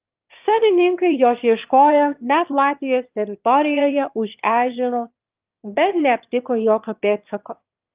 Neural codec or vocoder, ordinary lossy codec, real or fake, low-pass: autoencoder, 22.05 kHz, a latent of 192 numbers a frame, VITS, trained on one speaker; Opus, 24 kbps; fake; 3.6 kHz